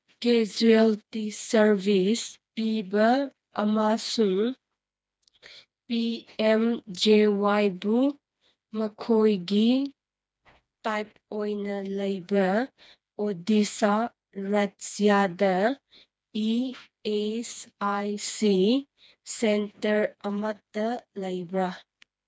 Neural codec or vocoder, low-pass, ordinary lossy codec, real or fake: codec, 16 kHz, 2 kbps, FreqCodec, smaller model; none; none; fake